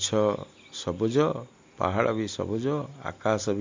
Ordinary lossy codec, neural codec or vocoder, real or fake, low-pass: MP3, 48 kbps; none; real; 7.2 kHz